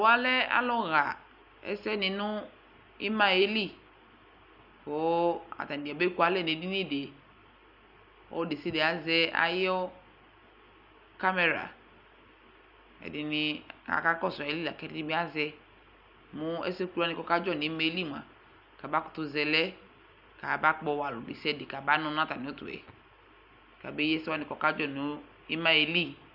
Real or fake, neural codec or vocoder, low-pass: real; none; 5.4 kHz